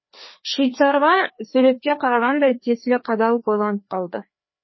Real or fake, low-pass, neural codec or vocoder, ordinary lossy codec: fake; 7.2 kHz; codec, 16 kHz, 2 kbps, FreqCodec, larger model; MP3, 24 kbps